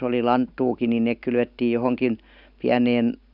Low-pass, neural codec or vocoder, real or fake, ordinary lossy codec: 5.4 kHz; none; real; none